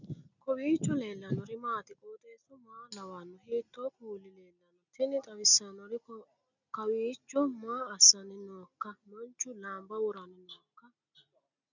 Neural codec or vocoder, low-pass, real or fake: none; 7.2 kHz; real